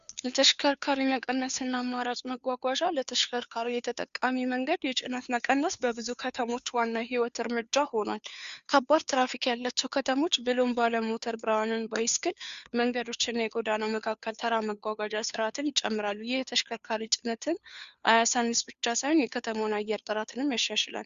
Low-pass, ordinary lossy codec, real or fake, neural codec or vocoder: 7.2 kHz; Opus, 64 kbps; fake; codec, 16 kHz, 2 kbps, FunCodec, trained on Chinese and English, 25 frames a second